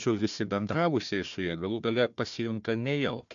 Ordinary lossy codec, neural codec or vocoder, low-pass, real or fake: AAC, 64 kbps; codec, 16 kHz, 1 kbps, FunCodec, trained on Chinese and English, 50 frames a second; 7.2 kHz; fake